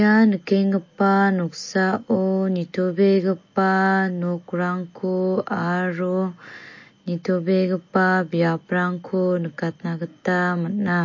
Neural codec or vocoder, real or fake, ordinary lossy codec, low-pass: none; real; MP3, 32 kbps; 7.2 kHz